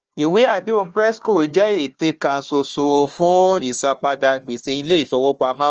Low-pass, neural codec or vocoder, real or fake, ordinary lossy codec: 7.2 kHz; codec, 16 kHz, 1 kbps, FunCodec, trained on Chinese and English, 50 frames a second; fake; Opus, 24 kbps